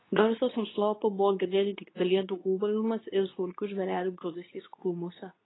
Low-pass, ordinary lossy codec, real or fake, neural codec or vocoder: 7.2 kHz; AAC, 16 kbps; fake; codec, 24 kHz, 0.9 kbps, WavTokenizer, medium speech release version 2